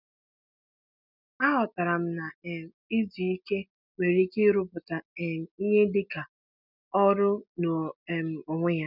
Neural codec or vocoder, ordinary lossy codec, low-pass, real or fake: none; none; 5.4 kHz; real